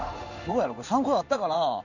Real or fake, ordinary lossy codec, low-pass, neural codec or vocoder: fake; none; 7.2 kHz; codec, 16 kHz in and 24 kHz out, 1 kbps, XY-Tokenizer